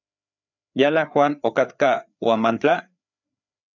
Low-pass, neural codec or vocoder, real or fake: 7.2 kHz; codec, 16 kHz, 4 kbps, FreqCodec, larger model; fake